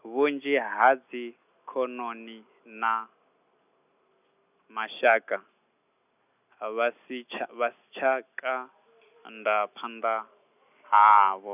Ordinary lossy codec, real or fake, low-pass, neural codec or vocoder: none; real; 3.6 kHz; none